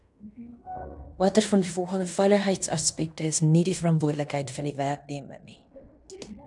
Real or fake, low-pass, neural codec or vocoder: fake; 10.8 kHz; codec, 16 kHz in and 24 kHz out, 0.9 kbps, LongCat-Audio-Codec, fine tuned four codebook decoder